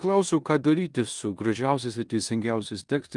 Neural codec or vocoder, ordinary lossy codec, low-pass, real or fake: codec, 16 kHz in and 24 kHz out, 0.9 kbps, LongCat-Audio-Codec, four codebook decoder; Opus, 32 kbps; 10.8 kHz; fake